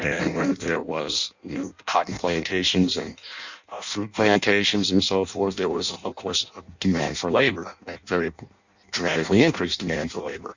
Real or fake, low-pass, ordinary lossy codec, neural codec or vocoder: fake; 7.2 kHz; Opus, 64 kbps; codec, 16 kHz in and 24 kHz out, 0.6 kbps, FireRedTTS-2 codec